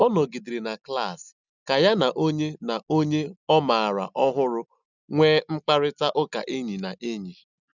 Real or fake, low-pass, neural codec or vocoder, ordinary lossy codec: real; 7.2 kHz; none; none